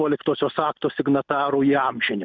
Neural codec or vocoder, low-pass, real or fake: none; 7.2 kHz; real